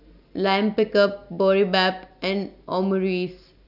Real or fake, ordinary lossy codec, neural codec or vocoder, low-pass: real; none; none; 5.4 kHz